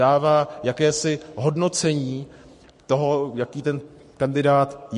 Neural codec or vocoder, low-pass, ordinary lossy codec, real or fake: codec, 44.1 kHz, 7.8 kbps, Pupu-Codec; 14.4 kHz; MP3, 48 kbps; fake